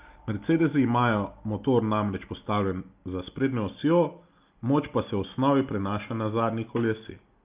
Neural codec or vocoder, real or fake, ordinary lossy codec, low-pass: none; real; Opus, 24 kbps; 3.6 kHz